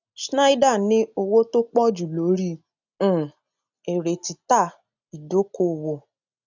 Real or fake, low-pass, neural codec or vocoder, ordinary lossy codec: real; 7.2 kHz; none; none